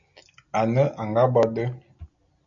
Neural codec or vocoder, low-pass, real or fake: none; 7.2 kHz; real